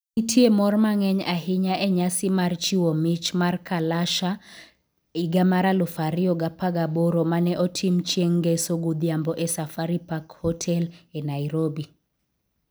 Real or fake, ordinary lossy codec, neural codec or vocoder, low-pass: real; none; none; none